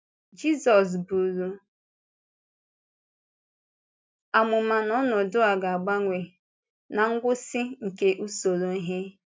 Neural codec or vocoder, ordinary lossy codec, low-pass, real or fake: none; none; none; real